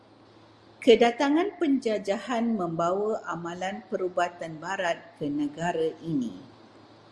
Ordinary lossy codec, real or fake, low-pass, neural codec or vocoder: Opus, 32 kbps; real; 10.8 kHz; none